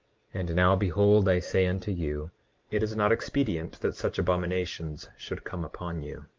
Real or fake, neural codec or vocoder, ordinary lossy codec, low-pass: fake; vocoder, 44.1 kHz, 128 mel bands every 512 samples, BigVGAN v2; Opus, 24 kbps; 7.2 kHz